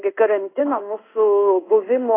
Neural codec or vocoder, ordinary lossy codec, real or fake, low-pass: codec, 16 kHz in and 24 kHz out, 1 kbps, XY-Tokenizer; AAC, 16 kbps; fake; 3.6 kHz